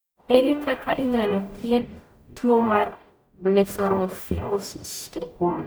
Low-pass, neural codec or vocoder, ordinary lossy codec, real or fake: none; codec, 44.1 kHz, 0.9 kbps, DAC; none; fake